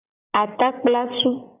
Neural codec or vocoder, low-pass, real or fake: none; 3.6 kHz; real